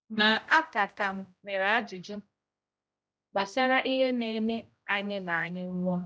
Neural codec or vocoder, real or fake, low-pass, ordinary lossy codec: codec, 16 kHz, 0.5 kbps, X-Codec, HuBERT features, trained on general audio; fake; none; none